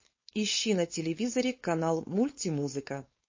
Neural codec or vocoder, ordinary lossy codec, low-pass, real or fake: codec, 16 kHz, 4.8 kbps, FACodec; MP3, 32 kbps; 7.2 kHz; fake